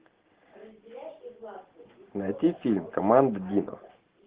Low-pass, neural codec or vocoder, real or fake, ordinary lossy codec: 3.6 kHz; none; real; Opus, 16 kbps